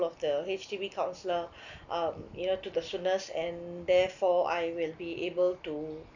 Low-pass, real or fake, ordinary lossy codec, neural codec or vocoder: 7.2 kHz; real; none; none